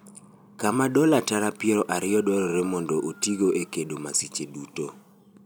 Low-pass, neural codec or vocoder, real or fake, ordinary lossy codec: none; none; real; none